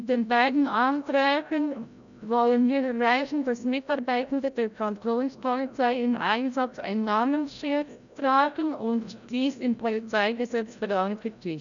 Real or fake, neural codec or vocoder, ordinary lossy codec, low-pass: fake; codec, 16 kHz, 0.5 kbps, FreqCodec, larger model; none; 7.2 kHz